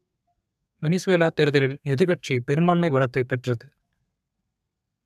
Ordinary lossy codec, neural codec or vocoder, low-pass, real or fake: none; codec, 32 kHz, 1.9 kbps, SNAC; 14.4 kHz; fake